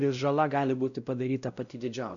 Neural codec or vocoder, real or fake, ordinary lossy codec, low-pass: codec, 16 kHz, 0.5 kbps, X-Codec, WavLM features, trained on Multilingual LibriSpeech; fake; MP3, 96 kbps; 7.2 kHz